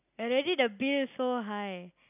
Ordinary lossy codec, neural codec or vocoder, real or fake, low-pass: none; none; real; 3.6 kHz